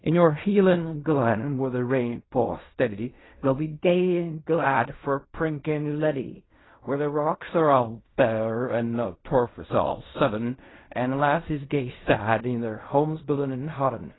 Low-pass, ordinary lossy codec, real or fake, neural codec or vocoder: 7.2 kHz; AAC, 16 kbps; fake; codec, 16 kHz in and 24 kHz out, 0.4 kbps, LongCat-Audio-Codec, fine tuned four codebook decoder